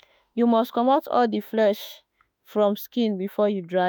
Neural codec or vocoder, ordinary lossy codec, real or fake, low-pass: autoencoder, 48 kHz, 32 numbers a frame, DAC-VAE, trained on Japanese speech; none; fake; none